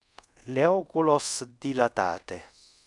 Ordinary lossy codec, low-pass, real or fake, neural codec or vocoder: MP3, 96 kbps; 10.8 kHz; fake; codec, 24 kHz, 0.5 kbps, DualCodec